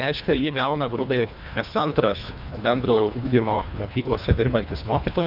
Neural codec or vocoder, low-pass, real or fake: codec, 24 kHz, 1.5 kbps, HILCodec; 5.4 kHz; fake